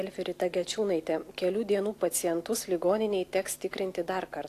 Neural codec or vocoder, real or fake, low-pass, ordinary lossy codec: none; real; 14.4 kHz; AAC, 64 kbps